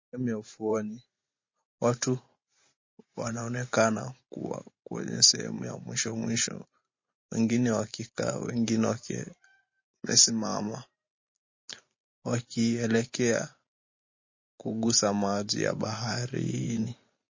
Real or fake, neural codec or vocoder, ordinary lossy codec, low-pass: fake; vocoder, 44.1 kHz, 128 mel bands every 512 samples, BigVGAN v2; MP3, 32 kbps; 7.2 kHz